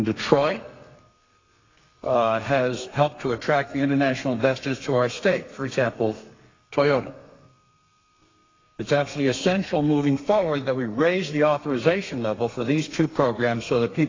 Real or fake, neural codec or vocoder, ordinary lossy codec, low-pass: fake; codec, 32 kHz, 1.9 kbps, SNAC; AAC, 32 kbps; 7.2 kHz